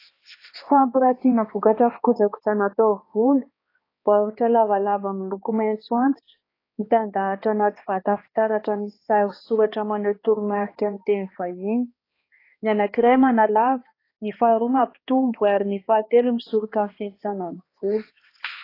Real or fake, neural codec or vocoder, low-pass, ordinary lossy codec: fake; codec, 16 kHz, 2 kbps, X-Codec, HuBERT features, trained on balanced general audio; 5.4 kHz; AAC, 24 kbps